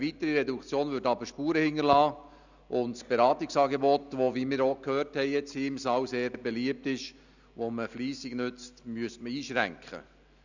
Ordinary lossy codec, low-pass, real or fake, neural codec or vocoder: none; 7.2 kHz; real; none